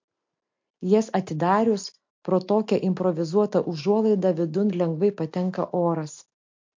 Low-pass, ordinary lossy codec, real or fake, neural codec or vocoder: 7.2 kHz; MP3, 48 kbps; real; none